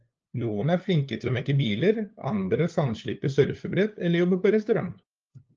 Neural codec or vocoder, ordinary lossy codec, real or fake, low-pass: codec, 16 kHz, 2 kbps, FunCodec, trained on LibriTTS, 25 frames a second; Opus, 24 kbps; fake; 7.2 kHz